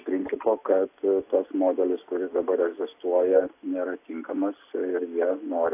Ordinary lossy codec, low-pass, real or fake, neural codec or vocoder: AAC, 24 kbps; 3.6 kHz; real; none